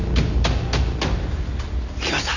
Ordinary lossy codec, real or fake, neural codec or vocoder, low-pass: none; real; none; 7.2 kHz